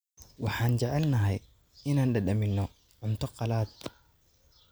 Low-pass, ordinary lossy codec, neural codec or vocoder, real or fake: none; none; none; real